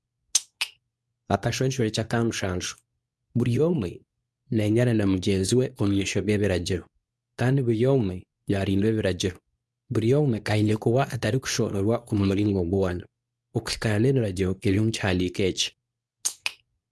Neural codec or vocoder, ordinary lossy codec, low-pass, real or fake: codec, 24 kHz, 0.9 kbps, WavTokenizer, medium speech release version 2; none; none; fake